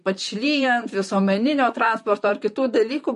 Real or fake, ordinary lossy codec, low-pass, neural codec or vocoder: fake; MP3, 48 kbps; 14.4 kHz; vocoder, 44.1 kHz, 128 mel bands, Pupu-Vocoder